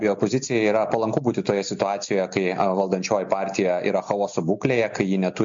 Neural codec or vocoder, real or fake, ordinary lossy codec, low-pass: none; real; MP3, 48 kbps; 7.2 kHz